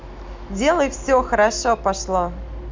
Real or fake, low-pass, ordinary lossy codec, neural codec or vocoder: real; 7.2 kHz; MP3, 64 kbps; none